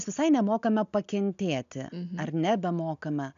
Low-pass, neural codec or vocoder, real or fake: 7.2 kHz; none; real